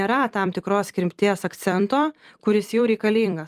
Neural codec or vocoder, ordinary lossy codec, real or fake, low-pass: vocoder, 44.1 kHz, 128 mel bands every 256 samples, BigVGAN v2; Opus, 32 kbps; fake; 14.4 kHz